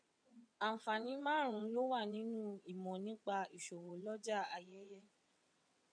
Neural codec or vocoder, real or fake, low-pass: vocoder, 22.05 kHz, 80 mel bands, WaveNeXt; fake; 9.9 kHz